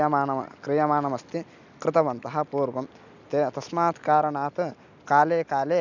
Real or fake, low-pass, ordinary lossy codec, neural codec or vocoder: real; 7.2 kHz; none; none